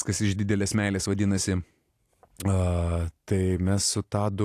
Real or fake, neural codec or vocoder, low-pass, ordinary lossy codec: real; none; 14.4 kHz; AAC, 64 kbps